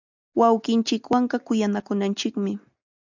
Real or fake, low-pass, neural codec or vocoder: real; 7.2 kHz; none